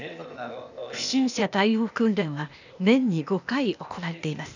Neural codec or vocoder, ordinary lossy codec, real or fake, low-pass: codec, 16 kHz, 0.8 kbps, ZipCodec; none; fake; 7.2 kHz